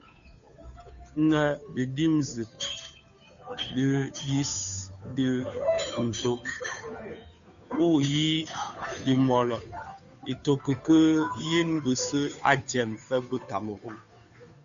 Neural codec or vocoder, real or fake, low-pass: codec, 16 kHz, 2 kbps, FunCodec, trained on Chinese and English, 25 frames a second; fake; 7.2 kHz